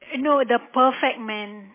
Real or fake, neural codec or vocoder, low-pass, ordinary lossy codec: real; none; 3.6 kHz; MP3, 16 kbps